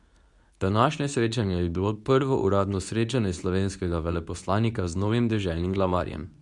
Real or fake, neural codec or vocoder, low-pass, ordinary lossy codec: fake; codec, 24 kHz, 0.9 kbps, WavTokenizer, medium speech release version 2; 10.8 kHz; none